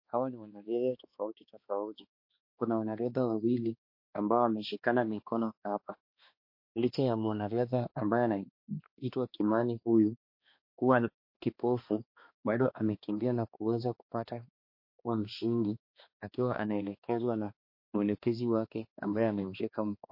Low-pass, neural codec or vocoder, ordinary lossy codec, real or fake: 5.4 kHz; codec, 16 kHz, 2 kbps, X-Codec, HuBERT features, trained on balanced general audio; MP3, 32 kbps; fake